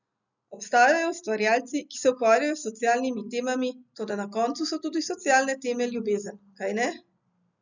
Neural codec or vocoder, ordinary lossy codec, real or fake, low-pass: none; none; real; 7.2 kHz